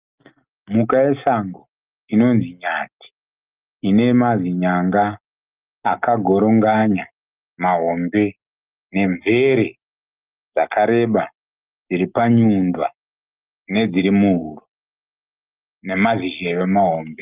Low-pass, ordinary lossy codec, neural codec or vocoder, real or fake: 3.6 kHz; Opus, 24 kbps; none; real